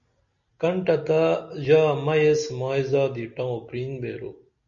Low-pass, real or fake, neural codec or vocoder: 7.2 kHz; real; none